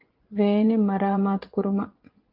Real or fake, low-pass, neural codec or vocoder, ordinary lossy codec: fake; 5.4 kHz; vocoder, 24 kHz, 100 mel bands, Vocos; Opus, 24 kbps